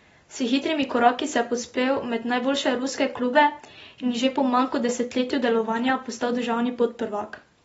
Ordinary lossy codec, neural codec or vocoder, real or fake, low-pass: AAC, 24 kbps; none; real; 19.8 kHz